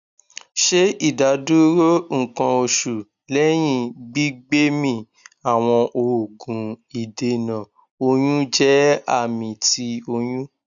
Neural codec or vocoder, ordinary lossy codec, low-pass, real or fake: none; none; 7.2 kHz; real